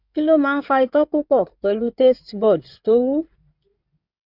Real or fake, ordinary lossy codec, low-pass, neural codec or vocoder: fake; none; 5.4 kHz; codec, 16 kHz in and 24 kHz out, 2.2 kbps, FireRedTTS-2 codec